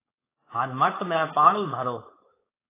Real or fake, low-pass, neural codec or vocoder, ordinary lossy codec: fake; 3.6 kHz; codec, 16 kHz, 4.8 kbps, FACodec; AAC, 24 kbps